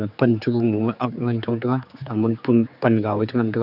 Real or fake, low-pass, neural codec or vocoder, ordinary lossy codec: fake; 5.4 kHz; codec, 16 kHz, 4 kbps, X-Codec, HuBERT features, trained on general audio; none